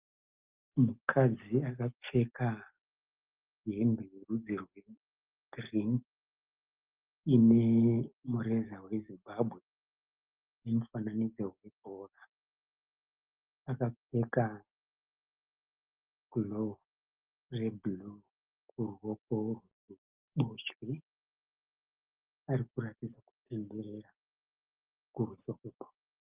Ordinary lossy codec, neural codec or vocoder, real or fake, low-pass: Opus, 32 kbps; none; real; 3.6 kHz